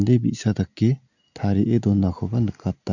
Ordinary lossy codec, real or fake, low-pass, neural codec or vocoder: none; real; 7.2 kHz; none